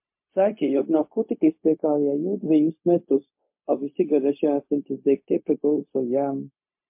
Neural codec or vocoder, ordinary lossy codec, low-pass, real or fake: codec, 16 kHz, 0.4 kbps, LongCat-Audio-Codec; MP3, 32 kbps; 3.6 kHz; fake